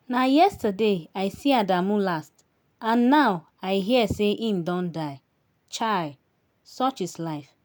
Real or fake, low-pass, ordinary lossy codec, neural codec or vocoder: real; none; none; none